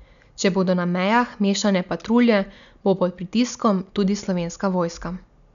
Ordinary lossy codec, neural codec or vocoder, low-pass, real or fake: none; none; 7.2 kHz; real